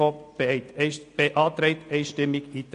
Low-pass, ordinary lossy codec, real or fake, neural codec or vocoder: 9.9 kHz; AAC, 48 kbps; real; none